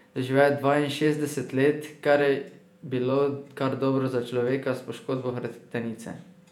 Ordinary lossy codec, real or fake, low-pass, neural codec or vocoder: none; fake; 19.8 kHz; vocoder, 48 kHz, 128 mel bands, Vocos